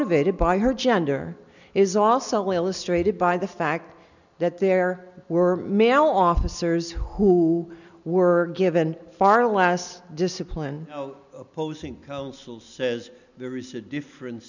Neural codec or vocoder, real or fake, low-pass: none; real; 7.2 kHz